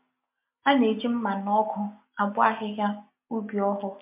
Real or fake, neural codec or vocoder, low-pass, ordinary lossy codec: real; none; 3.6 kHz; MP3, 32 kbps